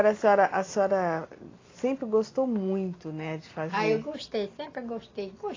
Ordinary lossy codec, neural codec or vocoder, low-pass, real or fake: AAC, 32 kbps; none; 7.2 kHz; real